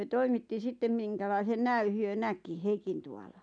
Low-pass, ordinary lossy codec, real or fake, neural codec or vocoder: 9.9 kHz; MP3, 96 kbps; real; none